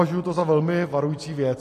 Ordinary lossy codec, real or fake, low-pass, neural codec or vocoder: AAC, 48 kbps; real; 14.4 kHz; none